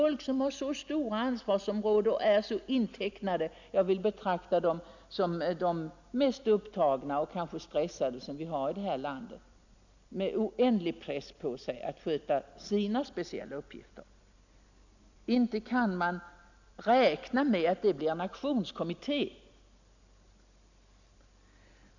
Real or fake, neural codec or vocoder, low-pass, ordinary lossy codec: real; none; 7.2 kHz; none